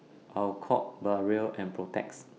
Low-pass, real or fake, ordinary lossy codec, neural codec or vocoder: none; real; none; none